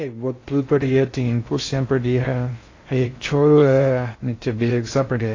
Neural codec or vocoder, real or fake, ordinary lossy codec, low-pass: codec, 16 kHz in and 24 kHz out, 0.6 kbps, FocalCodec, streaming, 2048 codes; fake; AAC, 32 kbps; 7.2 kHz